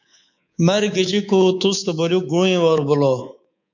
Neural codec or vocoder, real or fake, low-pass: codec, 24 kHz, 3.1 kbps, DualCodec; fake; 7.2 kHz